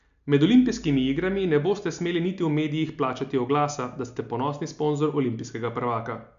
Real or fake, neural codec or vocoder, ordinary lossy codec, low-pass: real; none; AAC, 96 kbps; 7.2 kHz